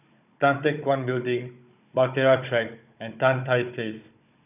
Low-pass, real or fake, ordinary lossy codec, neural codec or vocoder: 3.6 kHz; fake; none; codec, 16 kHz, 16 kbps, FunCodec, trained on Chinese and English, 50 frames a second